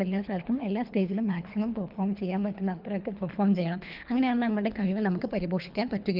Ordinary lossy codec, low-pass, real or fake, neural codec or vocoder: Opus, 32 kbps; 5.4 kHz; fake; codec, 24 kHz, 3 kbps, HILCodec